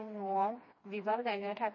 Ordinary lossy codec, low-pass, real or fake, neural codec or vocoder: MP3, 32 kbps; 7.2 kHz; fake; codec, 16 kHz, 2 kbps, FreqCodec, smaller model